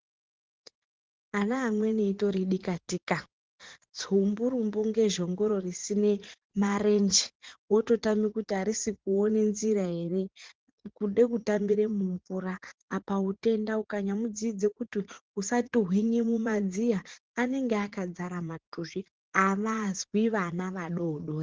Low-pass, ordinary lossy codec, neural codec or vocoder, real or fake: 7.2 kHz; Opus, 16 kbps; vocoder, 44.1 kHz, 80 mel bands, Vocos; fake